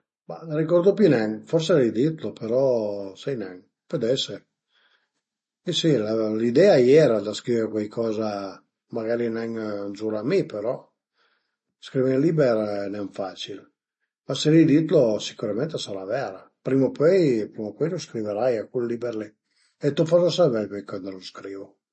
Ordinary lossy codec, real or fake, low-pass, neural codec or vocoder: MP3, 32 kbps; real; 10.8 kHz; none